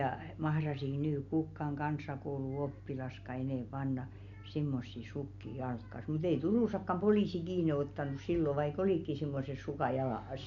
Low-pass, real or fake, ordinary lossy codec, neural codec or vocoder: 7.2 kHz; real; none; none